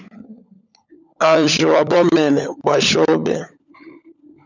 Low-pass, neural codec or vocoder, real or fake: 7.2 kHz; codec, 16 kHz, 16 kbps, FunCodec, trained on LibriTTS, 50 frames a second; fake